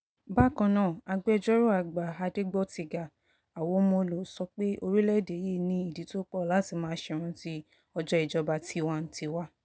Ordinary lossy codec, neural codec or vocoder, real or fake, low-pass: none; none; real; none